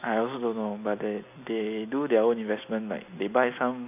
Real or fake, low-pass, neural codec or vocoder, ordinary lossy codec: real; 3.6 kHz; none; none